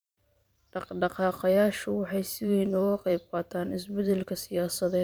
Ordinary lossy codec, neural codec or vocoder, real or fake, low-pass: none; vocoder, 44.1 kHz, 128 mel bands every 512 samples, BigVGAN v2; fake; none